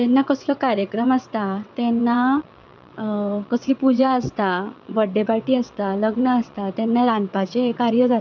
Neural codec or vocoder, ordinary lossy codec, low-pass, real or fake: vocoder, 22.05 kHz, 80 mel bands, WaveNeXt; none; 7.2 kHz; fake